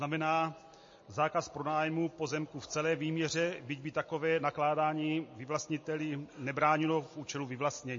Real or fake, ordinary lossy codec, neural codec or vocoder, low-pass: real; MP3, 32 kbps; none; 7.2 kHz